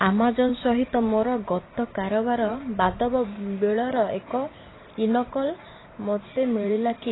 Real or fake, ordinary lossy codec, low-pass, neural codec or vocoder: fake; AAC, 16 kbps; 7.2 kHz; vocoder, 44.1 kHz, 128 mel bands every 512 samples, BigVGAN v2